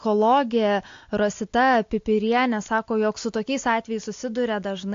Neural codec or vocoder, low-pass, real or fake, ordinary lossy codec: none; 7.2 kHz; real; AAC, 48 kbps